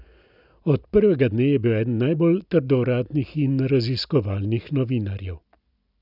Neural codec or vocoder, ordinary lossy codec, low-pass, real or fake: none; none; 5.4 kHz; real